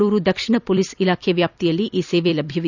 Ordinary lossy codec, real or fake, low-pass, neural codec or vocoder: none; fake; 7.2 kHz; vocoder, 44.1 kHz, 128 mel bands every 256 samples, BigVGAN v2